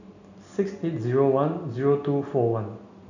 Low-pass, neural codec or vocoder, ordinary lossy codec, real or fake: 7.2 kHz; none; none; real